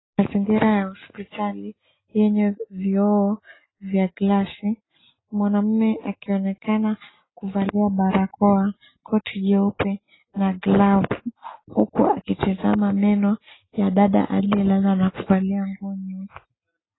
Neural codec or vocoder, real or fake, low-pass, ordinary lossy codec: none; real; 7.2 kHz; AAC, 16 kbps